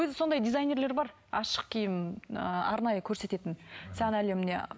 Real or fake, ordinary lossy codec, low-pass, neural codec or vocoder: real; none; none; none